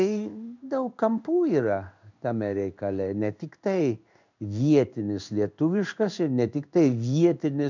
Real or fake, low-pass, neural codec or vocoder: fake; 7.2 kHz; codec, 16 kHz in and 24 kHz out, 1 kbps, XY-Tokenizer